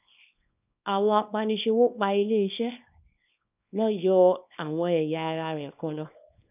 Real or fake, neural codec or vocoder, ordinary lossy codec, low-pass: fake; codec, 24 kHz, 0.9 kbps, WavTokenizer, small release; none; 3.6 kHz